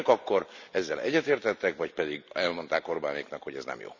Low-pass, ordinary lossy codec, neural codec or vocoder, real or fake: 7.2 kHz; none; none; real